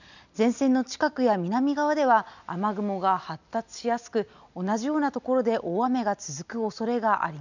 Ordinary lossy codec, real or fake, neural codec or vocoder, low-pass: none; real; none; 7.2 kHz